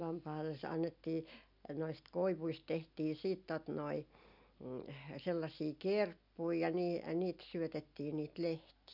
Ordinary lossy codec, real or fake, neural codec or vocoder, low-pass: none; real; none; 5.4 kHz